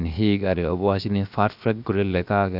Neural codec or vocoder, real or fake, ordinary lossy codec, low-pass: codec, 16 kHz, 0.7 kbps, FocalCodec; fake; none; 5.4 kHz